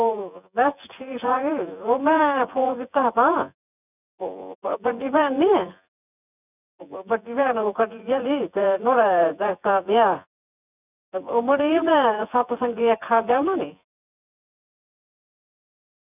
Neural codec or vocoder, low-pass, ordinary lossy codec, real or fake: vocoder, 24 kHz, 100 mel bands, Vocos; 3.6 kHz; none; fake